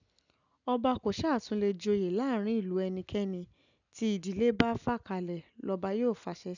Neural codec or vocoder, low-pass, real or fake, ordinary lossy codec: none; 7.2 kHz; real; none